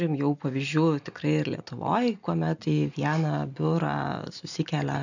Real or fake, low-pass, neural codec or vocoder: real; 7.2 kHz; none